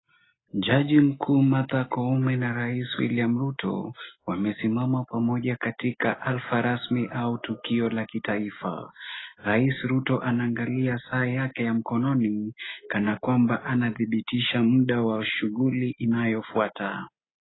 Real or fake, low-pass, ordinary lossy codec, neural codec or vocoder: real; 7.2 kHz; AAC, 16 kbps; none